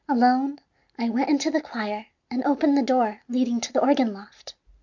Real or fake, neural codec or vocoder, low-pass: real; none; 7.2 kHz